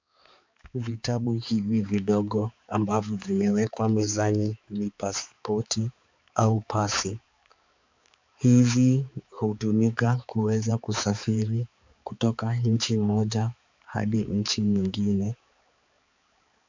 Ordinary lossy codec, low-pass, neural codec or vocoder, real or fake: MP3, 64 kbps; 7.2 kHz; codec, 16 kHz, 4 kbps, X-Codec, HuBERT features, trained on balanced general audio; fake